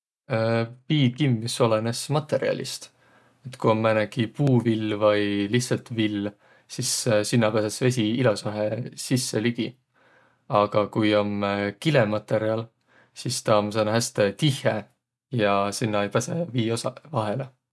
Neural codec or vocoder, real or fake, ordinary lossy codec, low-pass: none; real; none; none